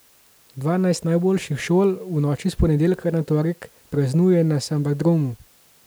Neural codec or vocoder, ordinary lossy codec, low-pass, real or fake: none; none; none; real